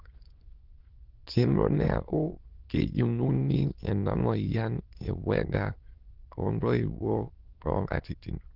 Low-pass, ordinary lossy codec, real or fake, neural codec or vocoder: 5.4 kHz; Opus, 16 kbps; fake; autoencoder, 22.05 kHz, a latent of 192 numbers a frame, VITS, trained on many speakers